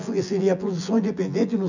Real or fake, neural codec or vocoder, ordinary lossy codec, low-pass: fake; vocoder, 24 kHz, 100 mel bands, Vocos; none; 7.2 kHz